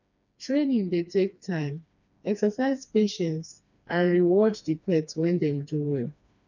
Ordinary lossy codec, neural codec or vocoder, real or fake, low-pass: none; codec, 16 kHz, 2 kbps, FreqCodec, smaller model; fake; 7.2 kHz